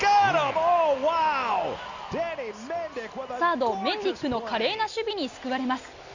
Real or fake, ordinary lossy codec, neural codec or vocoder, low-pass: real; Opus, 64 kbps; none; 7.2 kHz